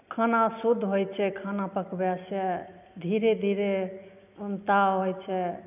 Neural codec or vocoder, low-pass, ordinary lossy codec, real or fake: none; 3.6 kHz; none; real